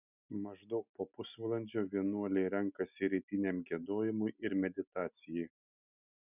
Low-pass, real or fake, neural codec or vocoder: 3.6 kHz; real; none